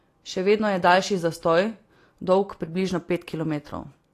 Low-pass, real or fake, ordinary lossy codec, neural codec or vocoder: 14.4 kHz; real; AAC, 48 kbps; none